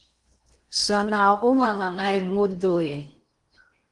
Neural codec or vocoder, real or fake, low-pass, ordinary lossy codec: codec, 16 kHz in and 24 kHz out, 0.8 kbps, FocalCodec, streaming, 65536 codes; fake; 10.8 kHz; Opus, 24 kbps